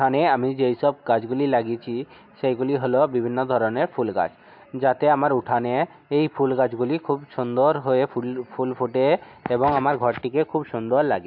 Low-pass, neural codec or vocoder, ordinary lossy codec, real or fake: 5.4 kHz; none; MP3, 48 kbps; real